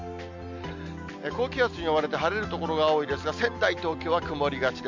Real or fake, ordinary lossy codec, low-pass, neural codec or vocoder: real; MP3, 64 kbps; 7.2 kHz; none